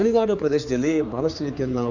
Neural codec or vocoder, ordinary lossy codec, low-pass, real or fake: codec, 16 kHz, 4 kbps, X-Codec, HuBERT features, trained on general audio; none; 7.2 kHz; fake